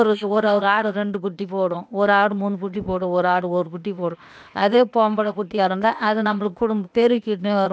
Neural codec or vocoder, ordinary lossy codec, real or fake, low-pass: codec, 16 kHz, 0.8 kbps, ZipCodec; none; fake; none